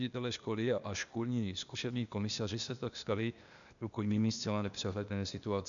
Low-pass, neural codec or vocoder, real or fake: 7.2 kHz; codec, 16 kHz, 0.8 kbps, ZipCodec; fake